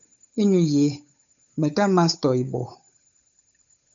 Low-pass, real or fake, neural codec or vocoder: 7.2 kHz; fake; codec, 16 kHz, 8 kbps, FunCodec, trained on Chinese and English, 25 frames a second